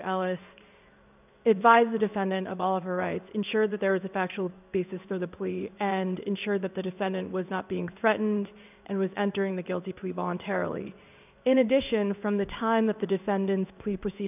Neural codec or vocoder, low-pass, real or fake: codec, 16 kHz in and 24 kHz out, 1 kbps, XY-Tokenizer; 3.6 kHz; fake